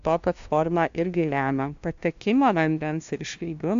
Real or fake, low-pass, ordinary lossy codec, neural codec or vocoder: fake; 7.2 kHz; AAC, 64 kbps; codec, 16 kHz, 0.5 kbps, FunCodec, trained on LibriTTS, 25 frames a second